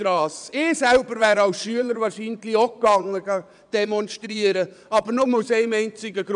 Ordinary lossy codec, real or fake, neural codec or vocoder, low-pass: none; fake; vocoder, 22.05 kHz, 80 mel bands, Vocos; 9.9 kHz